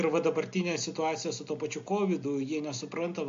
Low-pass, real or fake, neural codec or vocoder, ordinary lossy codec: 7.2 kHz; real; none; MP3, 48 kbps